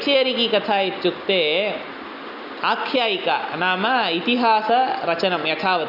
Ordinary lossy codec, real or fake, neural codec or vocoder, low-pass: none; real; none; 5.4 kHz